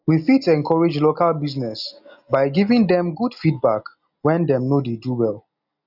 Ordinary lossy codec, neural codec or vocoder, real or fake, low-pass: none; none; real; 5.4 kHz